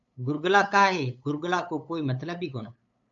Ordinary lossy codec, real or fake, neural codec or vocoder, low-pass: MP3, 64 kbps; fake; codec, 16 kHz, 8 kbps, FunCodec, trained on LibriTTS, 25 frames a second; 7.2 kHz